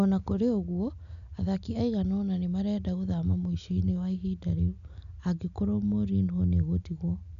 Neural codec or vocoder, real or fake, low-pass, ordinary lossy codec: none; real; 7.2 kHz; none